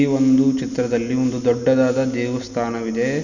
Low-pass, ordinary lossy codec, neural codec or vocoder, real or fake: 7.2 kHz; none; none; real